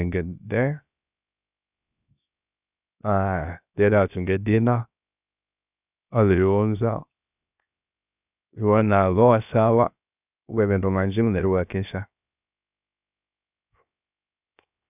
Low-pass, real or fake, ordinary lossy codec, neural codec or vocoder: 3.6 kHz; fake; none; codec, 16 kHz, 0.7 kbps, FocalCodec